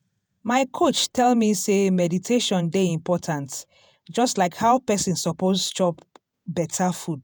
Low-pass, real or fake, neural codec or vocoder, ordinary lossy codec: none; fake; vocoder, 48 kHz, 128 mel bands, Vocos; none